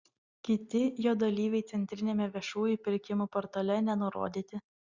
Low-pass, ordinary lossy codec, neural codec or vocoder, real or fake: 7.2 kHz; Opus, 64 kbps; none; real